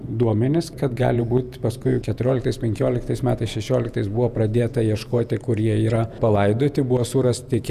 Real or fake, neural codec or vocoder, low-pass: fake; vocoder, 48 kHz, 128 mel bands, Vocos; 14.4 kHz